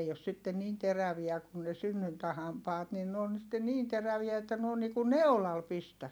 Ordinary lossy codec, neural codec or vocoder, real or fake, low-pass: none; none; real; none